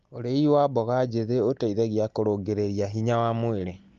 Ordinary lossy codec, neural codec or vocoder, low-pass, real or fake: Opus, 32 kbps; none; 7.2 kHz; real